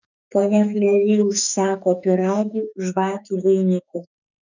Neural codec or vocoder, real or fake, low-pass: autoencoder, 48 kHz, 32 numbers a frame, DAC-VAE, trained on Japanese speech; fake; 7.2 kHz